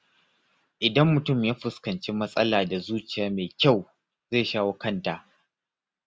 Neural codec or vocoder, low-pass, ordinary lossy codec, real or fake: none; none; none; real